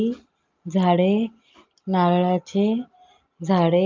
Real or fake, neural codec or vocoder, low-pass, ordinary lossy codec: real; none; 7.2 kHz; Opus, 24 kbps